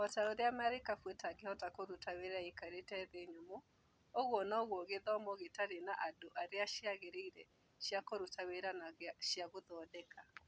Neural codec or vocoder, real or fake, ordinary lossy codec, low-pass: none; real; none; none